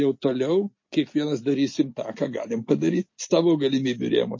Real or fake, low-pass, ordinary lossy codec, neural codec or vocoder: real; 7.2 kHz; MP3, 32 kbps; none